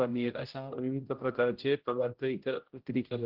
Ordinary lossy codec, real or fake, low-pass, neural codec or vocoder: Opus, 16 kbps; fake; 5.4 kHz; codec, 16 kHz, 0.5 kbps, X-Codec, HuBERT features, trained on general audio